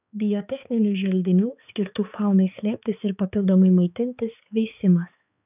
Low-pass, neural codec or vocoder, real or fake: 3.6 kHz; codec, 16 kHz, 4 kbps, X-Codec, HuBERT features, trained on balanced general audio; fake